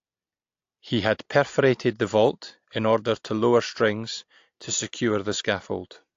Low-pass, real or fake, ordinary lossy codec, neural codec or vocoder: 7.2 kHz; real; AAC, 48 kbps; none